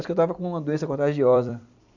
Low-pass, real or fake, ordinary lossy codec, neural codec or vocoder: 7.2 kHz; fake; none; vocoder, 44.1 kHz, 128 mel bands every 256 samples, BigVGAN v2